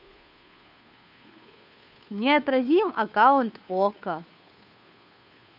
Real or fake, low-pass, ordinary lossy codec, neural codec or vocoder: fake; 5.4 kHz; none; codec, 16 kHz, 2 kbps, FunCodec, trained on Chinese and English, 25 frames a second